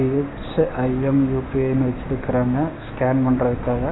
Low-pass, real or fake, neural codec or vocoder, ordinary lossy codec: 7.2 kHz; fake; codec, 16 kHz, 6 kbps, DAC; AAC, 16 kbps